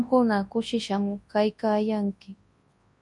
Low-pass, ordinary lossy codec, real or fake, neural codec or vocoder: 10.8 kHz; MP3, 48 kbps; fake; codec, 24 kHz, 0.9 kbps, WavTokenizer, large speech release